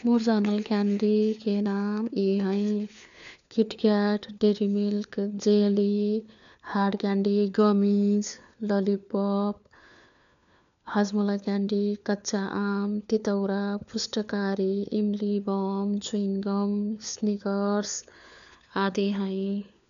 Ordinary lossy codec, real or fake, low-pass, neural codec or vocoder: none; fake; 7.2 kHz; codec, 16 kHz, 4 kbps, FunCodec, trained on LibriTTS, 50 frames a second